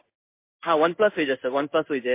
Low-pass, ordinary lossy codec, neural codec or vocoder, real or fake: 3.6 kHz; MP3, 24 kbps; codec, 16 kHz in and 24 kHz out, 1 kbps, XY-Tokenizer; fake